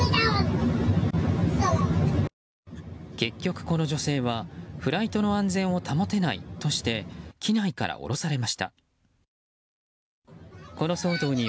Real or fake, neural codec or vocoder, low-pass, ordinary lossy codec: real; none; none; none